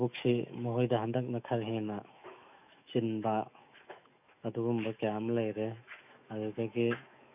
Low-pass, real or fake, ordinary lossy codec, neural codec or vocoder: 3.6 kHz; fake; none; autoencoder, 48 kHz, 128 numbers a frame, DAC-VAE, trained on Japanese speech